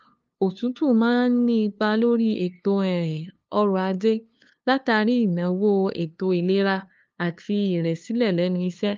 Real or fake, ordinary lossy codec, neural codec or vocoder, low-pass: fake; Opus, 24 kbps; codec, 16 kHz, 2 kbps, FunCodec, trained on LibriTTS, 25 frames a second; 7.2 kHz